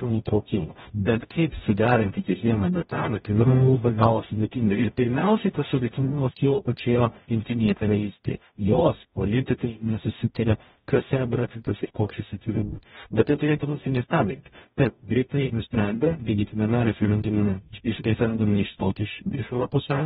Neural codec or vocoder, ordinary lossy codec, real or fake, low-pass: codec, 44.1 kHz, 0.9 kbps, DAC; AAC, 16 kbps; fake; 19.8 kHz